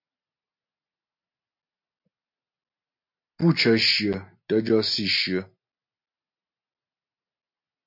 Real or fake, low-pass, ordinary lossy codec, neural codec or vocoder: real; 5.4 kHz; MP3, 32 kbps; none